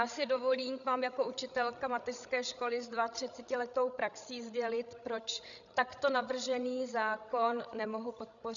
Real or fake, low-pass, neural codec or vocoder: fake; 7.2 kHz; codec, 16 kHz, 16 kbps, FreqCodec, larger model